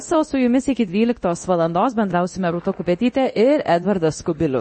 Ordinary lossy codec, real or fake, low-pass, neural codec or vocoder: MP3, 32 kbps; fake; 10.8 kHz; codec, 24 kHz, 0.9 kbps, DualCodec